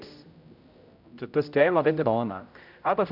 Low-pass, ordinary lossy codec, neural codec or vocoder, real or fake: 5.4 kHz; none; codec, 16 kHz, 0.5 kbps, X-Codec, HuBERT features, trained on general audio; fake